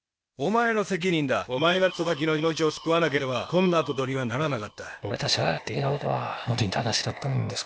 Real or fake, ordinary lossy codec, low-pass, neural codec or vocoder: fake; none; none; codec, 16 kHz, 0.8 kbps, ZipCodec